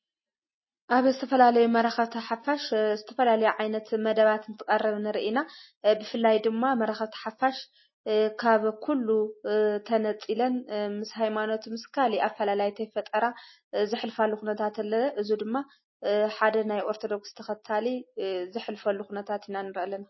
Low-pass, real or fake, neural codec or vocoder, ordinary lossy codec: 7.2 kHz; real; none; MP3, 24 kbps